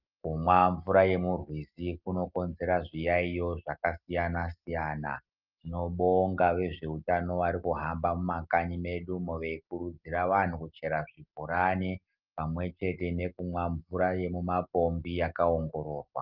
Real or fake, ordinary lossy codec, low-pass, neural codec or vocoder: real; Opus, 32 kbps; 5.4 kHz; none